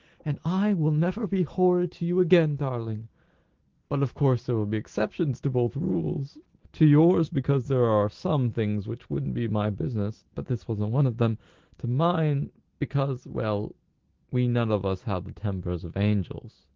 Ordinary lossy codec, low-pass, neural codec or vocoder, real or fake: Opus, 16 kbps; 7.2 kHz; none; real